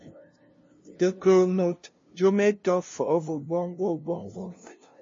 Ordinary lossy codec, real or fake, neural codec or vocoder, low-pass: MP3, 32 kbps; fake; codec, 16 kHz, 0.5 kbps, FunCodec, trained on LibriTTS, 25 frames a second; 7.2 kHz